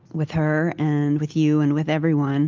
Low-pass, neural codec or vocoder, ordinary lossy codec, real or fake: 7.2 kHz; none; Opus, 32 kbps; real